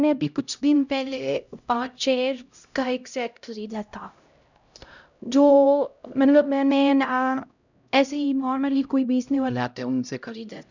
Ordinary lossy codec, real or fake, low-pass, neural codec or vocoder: none; fake; 7.2 kHz; codec, 16 kHz, 0.5 kbps, X-Codec, HuBERT features, trained on LibriSpeech